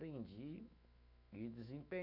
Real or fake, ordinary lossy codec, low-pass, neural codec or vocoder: fake; none; 5.4 kHz; autoencoder, 48 kHz, 128 numbers a frame, DAC-VAE, trained on Japanese speech